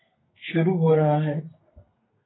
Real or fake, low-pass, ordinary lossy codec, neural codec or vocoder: fake; 7.2 kHz; AAC, 16 kbps; codec, 32 kHz, 1.9 kbps, SNAC